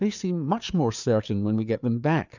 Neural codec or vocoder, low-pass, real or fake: codec, 16 kHz, 4 kbps, FreqCodec, larger model; 7.2 kHz; fake